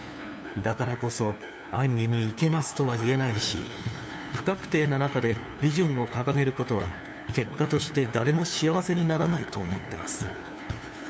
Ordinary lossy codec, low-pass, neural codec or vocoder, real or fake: none; none; codec, 16 kHz, 2 kbps, FunCodec, trained on LibriTTS, 25 frames a second; fake